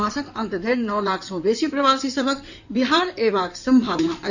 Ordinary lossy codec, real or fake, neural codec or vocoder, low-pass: none; fake; codec, 16 kHz in and 24 kHz out, 2.2 kbps, FireRedTTS-2 codec; 7.2 kHz